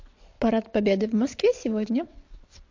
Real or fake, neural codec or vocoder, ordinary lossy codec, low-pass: fake; vocoder, 22.05 kHz, 80 mel bands, WaveNeXt; MP3, 48 kbps; 7.2 kHz